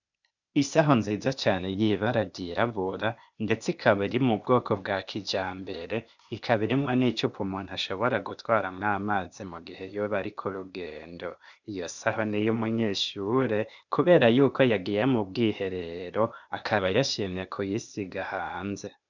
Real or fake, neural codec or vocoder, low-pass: fake; codec, 16 kHz, 0.8 kbps, ZipCodec; 7.2 kHz